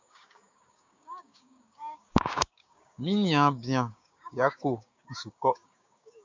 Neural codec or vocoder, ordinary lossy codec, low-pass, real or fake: codec, 16 kHz, 6 kbps, DAC; MP3, 64 kbps; 7.2 kHz; fake